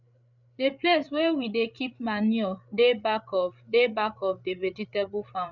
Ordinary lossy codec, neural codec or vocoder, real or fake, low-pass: none; codec, 16 kHz, 8 kbps, FreqCodec, larger model; fake; 7.2 kHz